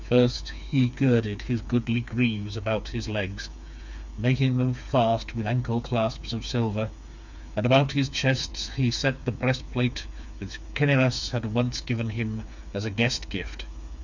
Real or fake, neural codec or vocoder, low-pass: fake; codec, 16 kHz, 4 kbps, FreqCodec, smaller model; 7.2 kHz